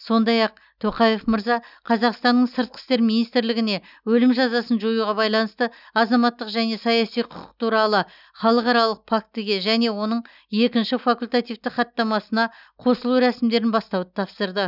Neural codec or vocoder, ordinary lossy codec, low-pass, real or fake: none; none; 5.4 kHz; real